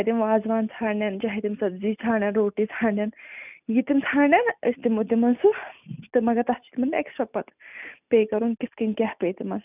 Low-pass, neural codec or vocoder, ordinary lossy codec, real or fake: 3.6 kHz; none; none; real